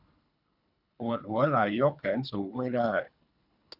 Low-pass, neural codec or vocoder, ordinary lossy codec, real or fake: 5.4 kHz; codec, 24 kHz, 3 kbps, HILCodec; none; fake